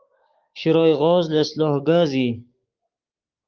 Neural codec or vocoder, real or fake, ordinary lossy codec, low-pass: codec, 16 kHz, 6 kbps, DAC; fake; Opus, 32 kbps; 7.2 kHz